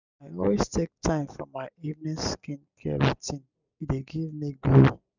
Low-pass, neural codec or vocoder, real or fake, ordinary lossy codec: 7.2 kHz; none; real; none